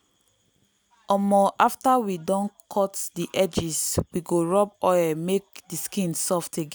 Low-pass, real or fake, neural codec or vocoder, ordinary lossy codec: none; real; none; none